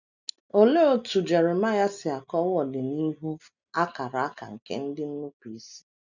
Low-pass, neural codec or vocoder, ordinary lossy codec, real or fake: 7.2 kHz; none; none; real